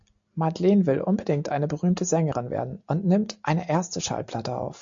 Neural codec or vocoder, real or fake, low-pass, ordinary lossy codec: none; real; 7.2 kHz; AAC, 64 kbps